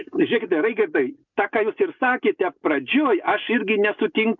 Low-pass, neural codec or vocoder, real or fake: 7.2 kHz; none; real